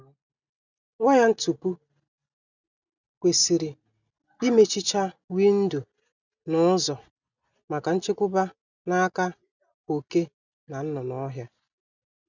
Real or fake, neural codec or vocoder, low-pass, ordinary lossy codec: real; none; 7.2 kHz; none